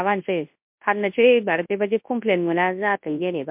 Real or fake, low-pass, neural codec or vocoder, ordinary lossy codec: fake; 3.6 kHz; codec, 24 kHz, 0.9 kbps, WavTokenizer, large speech release; MP3, 32 kbps